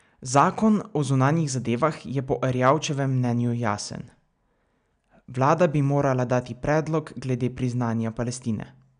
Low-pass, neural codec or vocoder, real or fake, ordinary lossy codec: 9.9 kHz; none; real; none